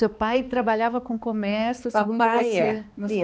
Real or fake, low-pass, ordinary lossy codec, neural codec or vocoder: fake; none; none; codec, 16 kHz, 2 kbps, X-Codec, HuBERT features, trained on balanced general audio